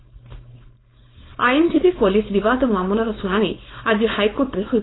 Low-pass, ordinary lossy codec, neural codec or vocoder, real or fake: 7.2 kHz; AAC, 16 kbps; codec, 16 kHz, 4.8 kbps, FACodec; fake